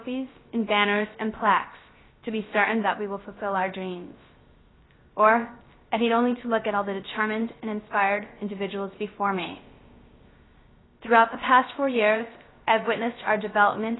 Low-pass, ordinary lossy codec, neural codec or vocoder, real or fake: 7.2 kHz; AAC, 16 kbps; codec, 16 kHz, 0.3 kbps, FocalCodec; fake